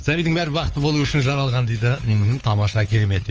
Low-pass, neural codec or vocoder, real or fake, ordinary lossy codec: 7.2 kHz; codec, 16 kHz, 4 kbps, X-Codec, WavLM features, trained on Multilingual LibriSpeech; fake; Opus, 24 kbps